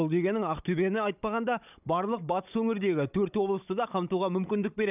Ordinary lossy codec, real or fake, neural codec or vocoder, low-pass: none; fake; codec, 16 kHz, 16 kbps, FunCodec, trained on Chinese and English, 50 frames a second; 3.6 kHz